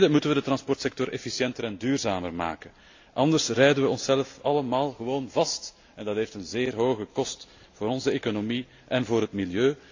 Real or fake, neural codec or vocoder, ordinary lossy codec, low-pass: real; none; AAC, 48 kbps; 7.2 kHz